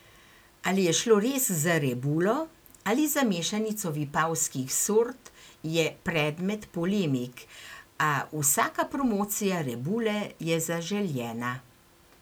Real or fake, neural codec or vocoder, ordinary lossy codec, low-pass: real; none; none; none